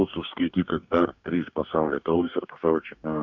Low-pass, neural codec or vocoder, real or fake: 7.2 kHz; codec, 44.1 kHz, 2.6 kbps, DAC; fake